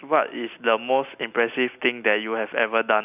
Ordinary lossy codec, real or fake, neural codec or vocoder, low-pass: none; real; none; 3.6 kHz